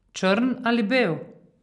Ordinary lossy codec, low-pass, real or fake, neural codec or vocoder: none; 10.8 kHz; real; none